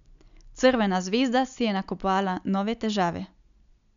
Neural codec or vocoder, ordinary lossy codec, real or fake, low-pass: none; none; real; 7.2 kHz